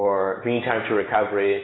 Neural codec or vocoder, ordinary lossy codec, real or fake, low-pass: none; AAC, 16 kbps; real; 7.2 kHz